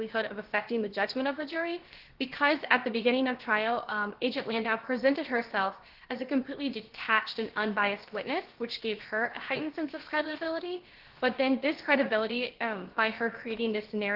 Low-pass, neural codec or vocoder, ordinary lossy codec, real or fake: 5.4 kHz; codec, 16 kHz, about 1 kbps, DyCAST, with the encoder's durations; Opus, 16 kbps; fake